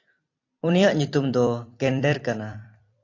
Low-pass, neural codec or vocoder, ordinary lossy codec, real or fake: 7.2 kHz; vocoder, 44.1 kHz, 128 mel bands every 256 samples, BigVGAN v2; AAC, 48 kbps; fake